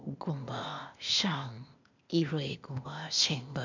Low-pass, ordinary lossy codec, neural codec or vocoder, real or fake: 7.2 kHz; none; codec, 16 kHz, 0.8 kbps, ZipCodec; fake